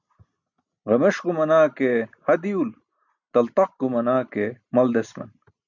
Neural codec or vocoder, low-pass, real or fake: none; 7.2 kHz; real